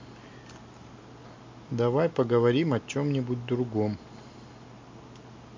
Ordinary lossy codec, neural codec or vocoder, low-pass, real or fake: MP3, 48 kbps; none; 7.2 kHz; real